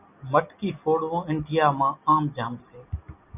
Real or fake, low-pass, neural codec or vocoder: real; 3.6 kHz; none